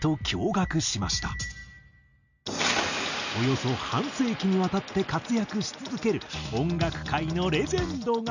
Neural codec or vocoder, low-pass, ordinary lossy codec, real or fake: none; 7.2 kHz; none; real